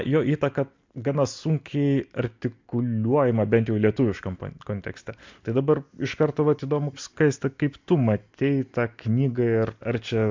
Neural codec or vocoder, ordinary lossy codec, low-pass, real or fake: none; MP3, 64 kbps; 7.2 kHz; real